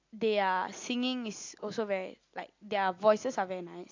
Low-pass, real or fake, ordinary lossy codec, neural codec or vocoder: 7.2 kHz; real; AAC, 48 kbps; none